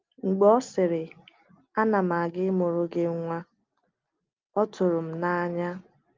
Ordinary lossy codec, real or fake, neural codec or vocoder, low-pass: Opus, 32 kbps; real; none; 7.2 kHz